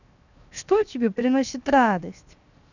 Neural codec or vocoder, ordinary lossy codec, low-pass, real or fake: codec, 16 kHz, 0.7 kbps, FocalCodec; none; 7.2 kHz; fake